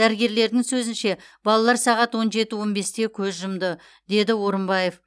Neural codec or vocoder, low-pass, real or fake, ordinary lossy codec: none; none; real; none